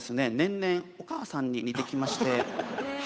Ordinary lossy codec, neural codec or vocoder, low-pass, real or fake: none; codec, 16 kHz, 8 kbps, FunCodec, trained on Chinese and English, 25 frames a second; none; fake